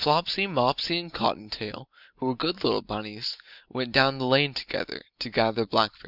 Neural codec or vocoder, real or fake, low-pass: none; real; 5.4 kHz